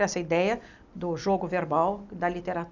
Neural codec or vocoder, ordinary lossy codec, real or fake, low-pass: none; none; real; 7.2 kHz